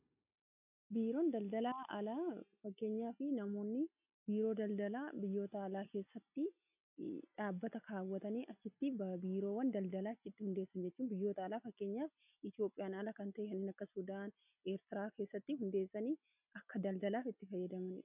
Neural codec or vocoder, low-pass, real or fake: none; 3.6 kHz; real